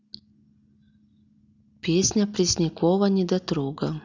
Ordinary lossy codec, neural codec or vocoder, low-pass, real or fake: none; none; 7.2 kHz; real